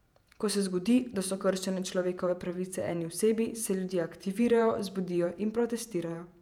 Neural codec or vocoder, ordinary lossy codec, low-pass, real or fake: vocoder, 48 kHz, 128 mel bands, Vocos; none; 19.8 kHz; fake